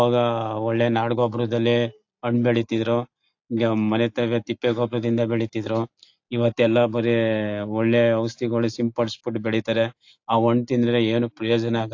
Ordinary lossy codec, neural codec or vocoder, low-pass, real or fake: AAC, 48 kbps; none; 7.2 kHz; real